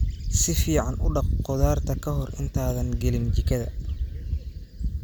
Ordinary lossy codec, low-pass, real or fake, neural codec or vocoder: none; none; real; none